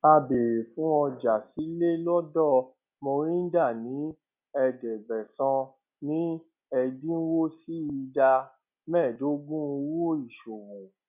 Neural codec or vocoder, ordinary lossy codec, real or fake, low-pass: none; none; real; 3.6 kHz